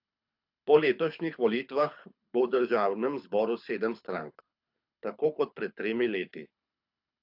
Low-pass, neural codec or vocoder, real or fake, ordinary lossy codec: 5.4 kHz; codec, 24 kHz, 6 kbps, HILCodec; fake; AAC, 48 kbps